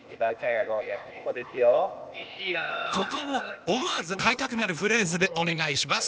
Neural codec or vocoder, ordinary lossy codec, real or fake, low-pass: codec, 16 kHz, 0.8 kbps, ZipCodec; none; fake; none